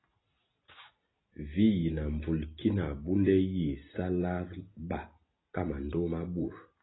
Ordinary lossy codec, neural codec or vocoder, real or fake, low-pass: AAC, 16 kbps; none; real; 7.2 kHz